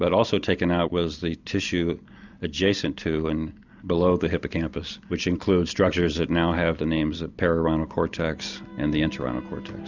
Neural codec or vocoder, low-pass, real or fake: none; 7.2 kHz; real